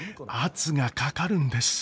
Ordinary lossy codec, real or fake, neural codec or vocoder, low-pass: none; real; none; none